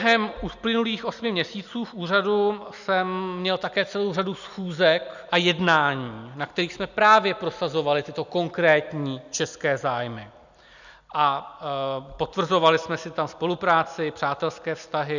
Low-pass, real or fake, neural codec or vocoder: 7.2 kHz; real; none